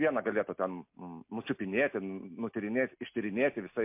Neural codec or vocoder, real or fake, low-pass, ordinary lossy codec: none; real; 3.6 kHz; MP3, 32 kbps